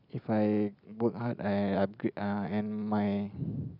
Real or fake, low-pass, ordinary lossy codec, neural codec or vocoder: real; 5.4 kHz; none; none